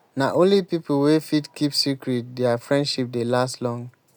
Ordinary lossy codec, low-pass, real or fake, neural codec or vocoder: none; none; real; none